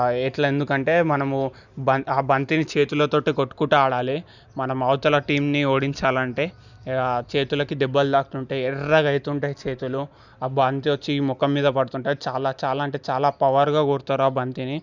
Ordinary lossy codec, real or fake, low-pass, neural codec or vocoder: none; real; 7.2 kHz; none